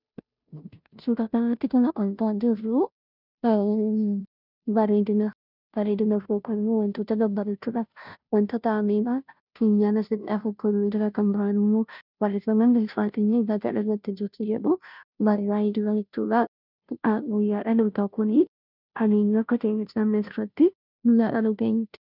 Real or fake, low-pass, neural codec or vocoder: fake; 5.4 kHz; codec, 16 kHz, 0.5 kbps, FunCodec, trained on Chinese and English, 25 frames a second